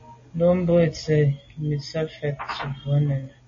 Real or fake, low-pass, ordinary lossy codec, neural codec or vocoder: real; 7.2 kHz; MP3, 32 kbps; none